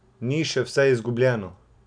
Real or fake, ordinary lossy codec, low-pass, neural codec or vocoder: real; none; 9.9 kHz; none